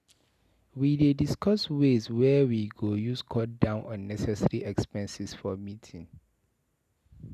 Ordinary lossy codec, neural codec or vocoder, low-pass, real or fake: none; none; 14.4 kHz; real